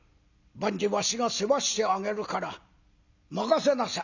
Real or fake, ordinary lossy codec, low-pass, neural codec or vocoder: real; none; 7.2 kHz; none